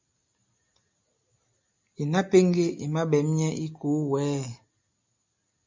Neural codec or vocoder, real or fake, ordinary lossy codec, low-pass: none; real; MP3, 64 kbps; 7.2 kHz